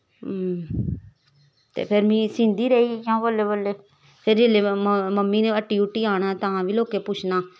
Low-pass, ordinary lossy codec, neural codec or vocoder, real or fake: none; none; none; real